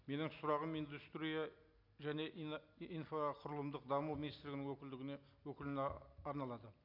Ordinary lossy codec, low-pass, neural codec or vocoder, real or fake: none; 5.4 kHz; none; real